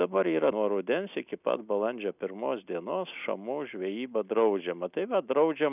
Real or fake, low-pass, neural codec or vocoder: real; 3.6 kHz; none